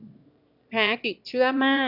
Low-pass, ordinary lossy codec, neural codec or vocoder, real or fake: 5.4 kHz; AAC, 48 kbps; autoencoder, 22.05 kHz, a latent of 192 numbers a frame, VITS, trained on one speaker; fake